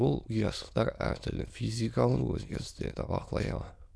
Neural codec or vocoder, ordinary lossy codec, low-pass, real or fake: autoencoder, 22.05 kHz, a latent of 192 numbers a frame, VITS, trained on many speakers; none; none; fake